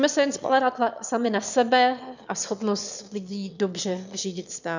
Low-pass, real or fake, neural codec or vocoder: 7.2 kHz; fake; autoencoder, 22.05 kHz, a latent of 192 numbers a frame, VITS, trained on one speaker